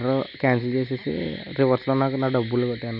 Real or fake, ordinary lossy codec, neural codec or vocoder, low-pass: real; none; none; 5.4 kHz